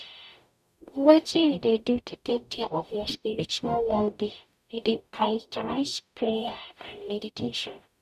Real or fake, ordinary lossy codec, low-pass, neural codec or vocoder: fake; none; 14.4 kHz; codec, 44.1 kHz, 0.9 kbps, DAC